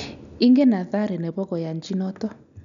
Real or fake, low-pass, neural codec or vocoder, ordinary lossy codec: real; 7.2 kHz; none; none